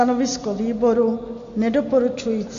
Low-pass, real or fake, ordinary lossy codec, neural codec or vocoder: 7.2 kHz; real; AAC, 48 kbps; none